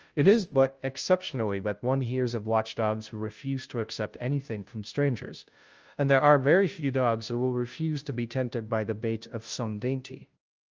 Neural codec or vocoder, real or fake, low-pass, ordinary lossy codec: codec, 16 kHz, 0.5 kbps, FunCodec, trained on Chinese and English, 25 frames a second; fake; 7.2 kHz; Opus, 24 kbps